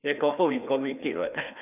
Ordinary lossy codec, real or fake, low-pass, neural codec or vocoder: none; fake; 3.6 kHz; codec, 16 kHz, 2 kbps, FreqCodec, larger model